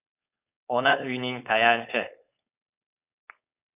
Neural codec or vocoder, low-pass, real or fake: codec, 16 kHz, 4.8 kbps, FACodec; 3.6 kHz; fake